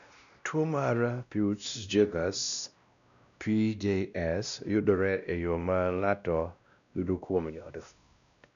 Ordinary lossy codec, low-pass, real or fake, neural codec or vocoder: none; 7.2 kHz; fake; codec, 16 kHz, 1 kbps, X-Codec, WavLM features, trained on Multilingual LibriSpeech